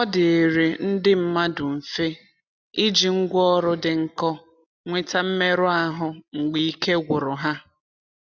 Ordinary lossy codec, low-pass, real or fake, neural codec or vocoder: none; 7.2 kHz; real; none